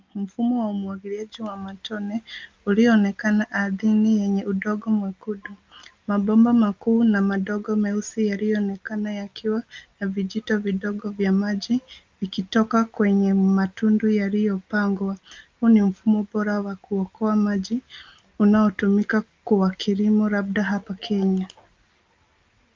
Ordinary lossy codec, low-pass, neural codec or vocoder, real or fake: Opus, 24 kbps; 7.2 kHz; none; real